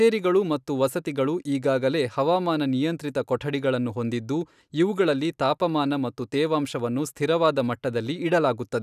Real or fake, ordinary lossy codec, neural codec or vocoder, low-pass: real; none; none; 14.4 kHz